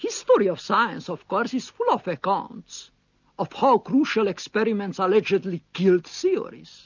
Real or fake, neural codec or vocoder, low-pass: real; none; 7.2 kHz